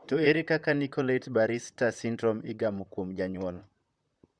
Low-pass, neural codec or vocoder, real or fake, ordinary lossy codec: 9.9 kHz; vocoder, 44.1 kHz, 128 mel bands, Pupu-Vocoder; fake; Opus, 64 kbps